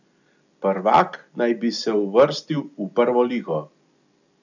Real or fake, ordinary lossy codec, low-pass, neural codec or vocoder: real; none; 7.2 kHz; none